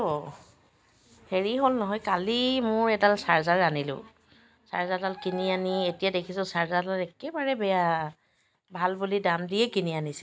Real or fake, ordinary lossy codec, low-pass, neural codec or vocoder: real; none; none; none